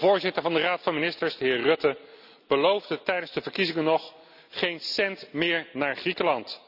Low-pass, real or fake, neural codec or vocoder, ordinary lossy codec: 5.4 kHz; real; none; none